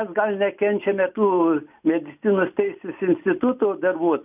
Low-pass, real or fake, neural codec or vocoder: 3.6 kHz; real; none